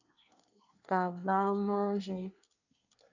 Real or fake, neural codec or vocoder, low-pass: fake; codec, 24 kHz, 1 kbps, SNAC; 7.2 kHz